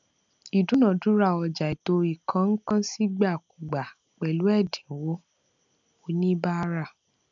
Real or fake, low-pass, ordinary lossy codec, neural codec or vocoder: real; 7.2 kHz; MP3, 96 kbps; none